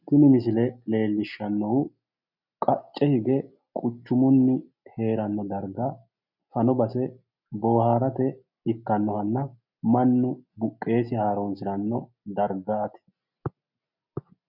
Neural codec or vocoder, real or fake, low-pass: none; real; 5.4 kHz